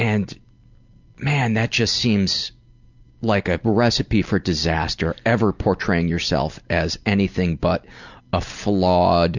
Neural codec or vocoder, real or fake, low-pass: none; real; 7.2 kHz